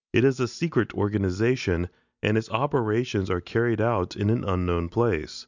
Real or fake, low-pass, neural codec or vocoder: real; 7.2 kHz; none